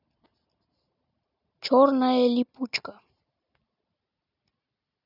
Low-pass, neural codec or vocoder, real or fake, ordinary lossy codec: 5.4 kHz; none; real; none